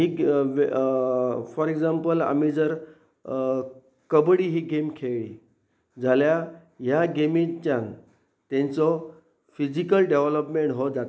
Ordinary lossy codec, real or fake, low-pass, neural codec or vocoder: none; real; none; none